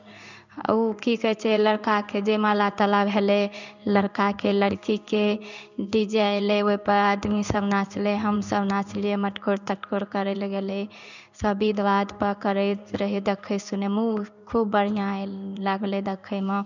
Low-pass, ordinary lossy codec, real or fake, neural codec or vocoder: 7.2 kHz; none; fake; codec, 16 kHz in and 24 kHz out, 1 kbps, XY-Tokenizer